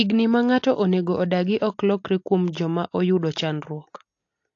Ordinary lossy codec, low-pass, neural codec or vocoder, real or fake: AAC, 48 kbps; 7.2 kHz; none; real